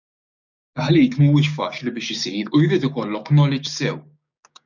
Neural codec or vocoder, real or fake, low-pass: codec, 16 kHz, 6 kbps, DAC; fake; 7.2 kHz